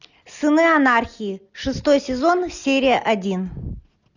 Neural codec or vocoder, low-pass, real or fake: none; 7.2 kHz; real